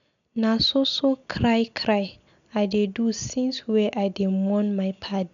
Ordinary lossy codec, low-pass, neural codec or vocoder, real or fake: none; 7.2 kHz; none; real